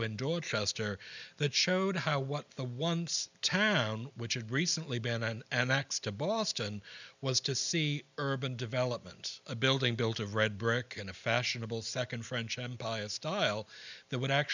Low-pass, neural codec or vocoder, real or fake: 7.2 kHz; none; real